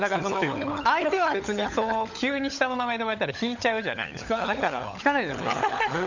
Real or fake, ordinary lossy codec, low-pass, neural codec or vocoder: fake; none; 7.2 kHz; codec, 16 kHz, 16 kbps, FunCodec, trained on LibriTTS, 50 frames a second